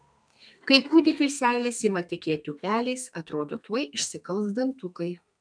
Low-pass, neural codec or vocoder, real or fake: 9.9 kHz; codec, 32 kHz, 1.9 kbps, SNAC; fake